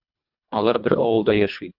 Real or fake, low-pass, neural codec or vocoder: fake; 5.4 kHz; codec, 24 kHz, 1.5 kbps, HILCodec